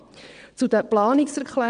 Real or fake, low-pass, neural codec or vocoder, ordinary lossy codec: fake; 9.9 kHz; vocoder, 22.05 kHz, 80 mel bands, WaveNeXt; none